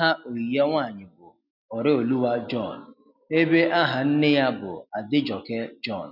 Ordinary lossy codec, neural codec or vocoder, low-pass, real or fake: none; none; 5.4 kHz; real